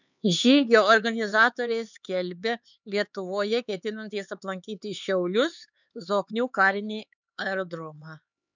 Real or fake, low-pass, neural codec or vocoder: fake; 7.2 kHz; codec, 16 kHz, 4 kbps, X-Codec, HuBERT features, trained on LibriSpeech